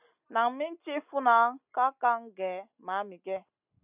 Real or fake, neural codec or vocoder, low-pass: real; none; 3.6 kHz